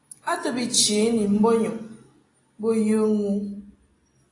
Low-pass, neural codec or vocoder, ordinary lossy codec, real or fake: 10.8 kHz; none; AAC, 32 kbps; real